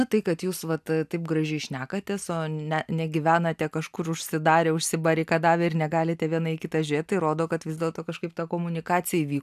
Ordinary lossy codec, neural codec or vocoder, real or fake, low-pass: AAC, 96 kbps; none; real; 14.4 kHz